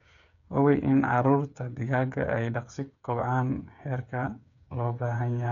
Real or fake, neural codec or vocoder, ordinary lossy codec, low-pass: fake; codec, 16 kHz, 8 kbps, FreqCodec, smaller model; none; 7.2 kHz